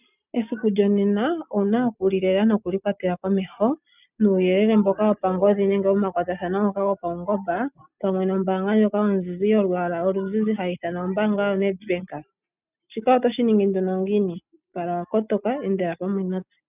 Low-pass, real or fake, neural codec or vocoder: 3.6 kHz; real; none